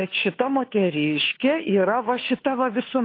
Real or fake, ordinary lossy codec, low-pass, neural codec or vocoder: fake; AAC, 32 kbps; 5.4 kHz; codec, 16 kHz, 2 kbps, FunCodec, trained on Chinese and English, 25 frames a second